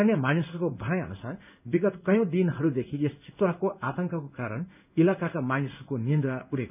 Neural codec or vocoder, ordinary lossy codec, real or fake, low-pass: codec, 16 kHz in and 24 kHz out, 1 kbps, XY-Tokenizer; none; fake; 3.6 kHz